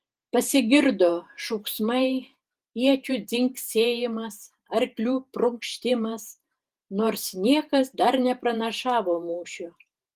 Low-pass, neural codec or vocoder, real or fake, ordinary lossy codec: 14.4 kHz; vocoder, 48 kHz, 128 mel bands, Vocos; fake; Opus, 24 kbps